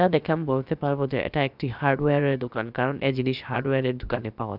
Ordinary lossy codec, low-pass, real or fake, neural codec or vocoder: none; 5.4 kHz; fake; codec, 16 kHz, about 1 kbps, DyCAST, with the encoder's durations